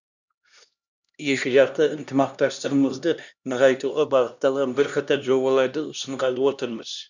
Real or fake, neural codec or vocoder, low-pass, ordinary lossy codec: fake; codec, 16 kHz, 1 kbps, X-Codec, HuBERT features, trained on LibriSpeech; 7.2 kHz; none